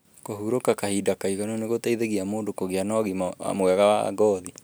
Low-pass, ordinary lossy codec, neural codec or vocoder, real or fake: none; none; none; real